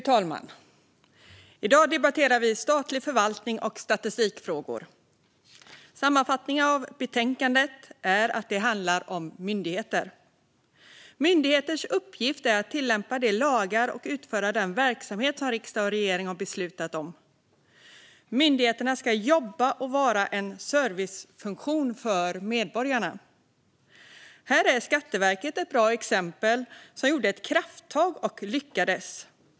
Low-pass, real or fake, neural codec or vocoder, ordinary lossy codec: none; real; none; none